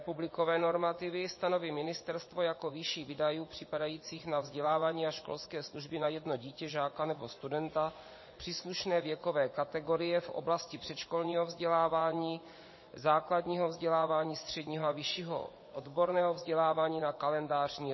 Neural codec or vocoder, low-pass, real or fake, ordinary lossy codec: vocoder, 24 kHz, 100 mel bands, Vocos; 7.2 kHz; fake; MP3, 24 kbps